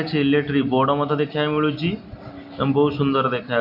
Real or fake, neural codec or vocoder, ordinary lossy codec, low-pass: real; none; none; 5.4 kHz